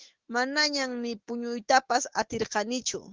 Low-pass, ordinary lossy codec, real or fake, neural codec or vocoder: 7.2 kHz; Opus, 16 kbps; fake; autoencoder, 48 kHz, 128 numbers a frame, DAC-VAE, trained on Japanese speech